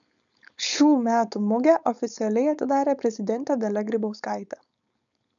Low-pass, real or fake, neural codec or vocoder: 7.2 kHz; fake; codec, 16 kHz, 4.8 kbps, FACodec